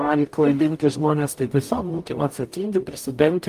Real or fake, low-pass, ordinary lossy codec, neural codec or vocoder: fake; 14.4 kHz; Opus, 32 kbps; codec, 44.1 kHz, 0.9 kbps, DAC